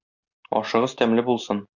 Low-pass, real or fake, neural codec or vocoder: 7.2 kHz; real; none